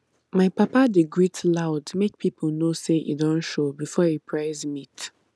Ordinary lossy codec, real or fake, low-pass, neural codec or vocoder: none; real; none; none